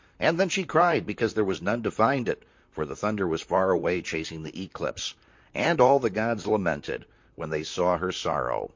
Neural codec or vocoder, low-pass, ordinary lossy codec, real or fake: vocoder, 44.1 kHz, 128 mel bands, Pupu-Vocoder; 7.2 kHz; MP3, 48 kbps; fake